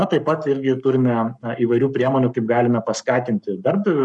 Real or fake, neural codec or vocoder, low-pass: fake; codec, 44.1 kHz, 7.8 kbps, Pupu-Codec; 10.8 kHz